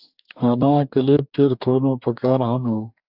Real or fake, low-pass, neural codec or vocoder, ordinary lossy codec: fake; 5.4 kHz; codec, 44.1 kHz, 2.6 kbps, DAC; AAC, 48 kbps